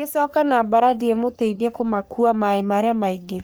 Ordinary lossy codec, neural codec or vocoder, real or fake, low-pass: none; codec, 44.1 kHz, 3.4 kbps, Pupu-Codec; fake; none